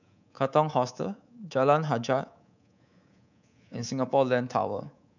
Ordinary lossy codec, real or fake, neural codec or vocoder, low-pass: none; fake; codec, 24 kHz, 3.1 kbps, DualCodec; 7.2 kHz